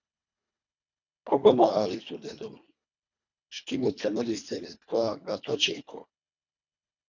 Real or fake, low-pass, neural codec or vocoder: fake; 7.2 kHz; codec, 24 kHz, 1.5 kbps, HILCodec